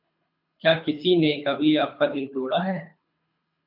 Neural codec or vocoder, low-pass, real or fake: codec, 24 kHz, 3 kbps, HILCodec; 5.4 kHz; fake